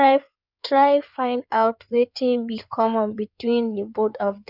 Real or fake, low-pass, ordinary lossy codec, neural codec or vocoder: fake; 5.4 kHz; none; codec, 16 kHz in and 24 kHz out, 2.2 kbps, FireRedTTS-2 codec